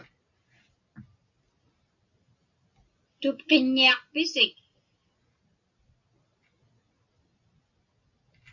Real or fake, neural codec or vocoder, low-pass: real; none; 7.2 kHz